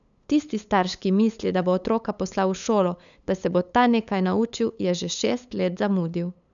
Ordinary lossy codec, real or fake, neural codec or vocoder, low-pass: none; fake; codec, 16 kHz, 8 kbps, FunCodec, trained on LibriTTS, 25 frames a second; 7.2 kHz